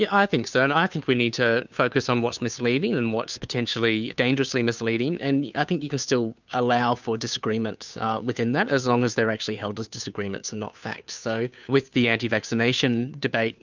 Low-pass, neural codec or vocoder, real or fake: 7.2 kHz; codec, 16 kHz, 2 kbps, FunCodec, trained on Chinese and English, 25 frames a second; fake